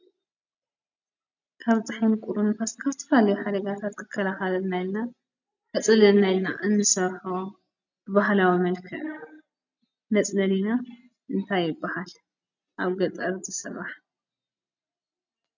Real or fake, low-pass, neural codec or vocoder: real; 7.2 kHz; none